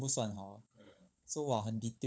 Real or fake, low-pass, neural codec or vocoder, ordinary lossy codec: fake; none; codec, 16 kHz, 16 kbps, FunCodec, trained on LibriTTS, 50 frames a second; none